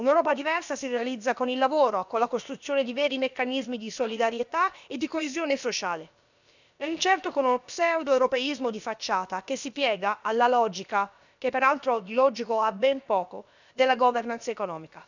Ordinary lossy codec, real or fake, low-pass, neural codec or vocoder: none; fake; 7.2 kHz; codec, 16 kHz, about 1 kbps, DyCAST, with the encoder's durations